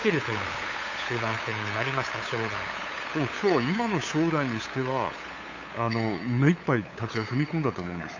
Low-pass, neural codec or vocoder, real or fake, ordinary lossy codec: 7.2 kHz; codec, 16 kHz, 8 kbps, FunCodec, trained on LibriTTS, 25 frames a second; fake; AAC, 48 kbps